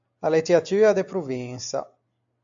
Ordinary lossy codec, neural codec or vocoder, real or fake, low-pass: AAC, 64 kbps; none; real; 7.2 kHz